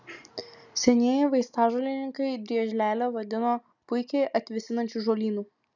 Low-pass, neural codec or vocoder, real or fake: 7.2 kHz; none; real